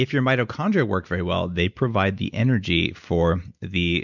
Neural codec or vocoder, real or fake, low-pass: none; real; 7.2 kHz